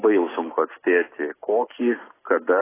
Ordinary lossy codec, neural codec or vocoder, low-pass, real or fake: AAC, 16 kbps; none; 3.6 kHz; real